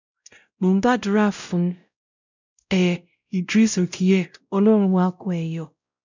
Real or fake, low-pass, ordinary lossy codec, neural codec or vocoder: fake; 7.2 kHz; none; codec, 16 kHz, 0.5 kbps, X-Codec, WavLM features, trained on Multilingual LibriSpeech